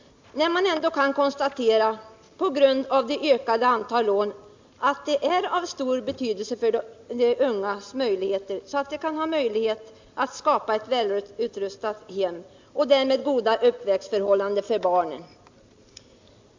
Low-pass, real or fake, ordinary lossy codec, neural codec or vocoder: 7.2 kHz; real; MP3, 64 kbps; none